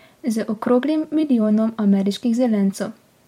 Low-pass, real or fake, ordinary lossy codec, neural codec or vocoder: 19.8 kHz; fake; MP3, 64 kbps; vocoder, 44.1 kHz, 128 mel bands every 256 samples, BigVGAN v2